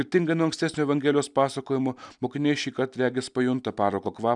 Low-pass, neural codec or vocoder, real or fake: 10.8 kHz; none; real